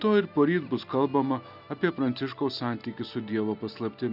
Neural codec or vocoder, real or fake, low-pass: none; real; 5.4 kHz